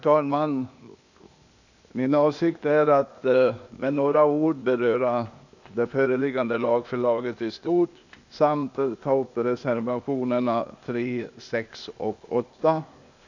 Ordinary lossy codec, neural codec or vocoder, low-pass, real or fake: none; codec, 16 kHz, 0.8 kbps, ZipCodec; 7.2 kHz; fake